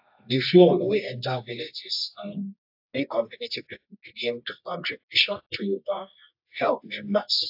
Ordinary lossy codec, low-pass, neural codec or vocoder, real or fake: AAC, 48 kbps; 5.4 kHz; codec, 24 kHz, 0.9 kbps, WavTokenizer, medium music audio release; fake